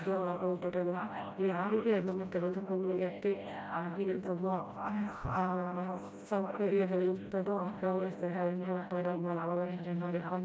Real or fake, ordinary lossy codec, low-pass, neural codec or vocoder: fake; none; none; codec, 16 kHz, 0.5 kbps, FreqCodec, smaller model